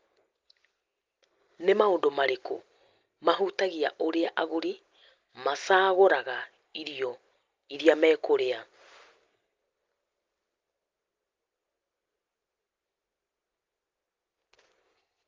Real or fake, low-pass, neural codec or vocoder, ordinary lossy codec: real; 7.2 kHz; none; Opus, 32 kbps